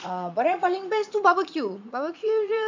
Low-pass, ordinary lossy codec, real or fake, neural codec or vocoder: 7.2 kHz; MP3, 64 kbps; fake; vocoder, 44.1 kHz, 80 mel bands, Vocos